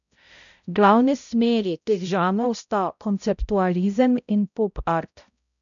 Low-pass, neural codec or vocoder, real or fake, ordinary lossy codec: 7.2 kHz; codec, 16 kHz, 0.5 kbps, X-Codec, HuBERT features, trained on balanced general audio; fake; none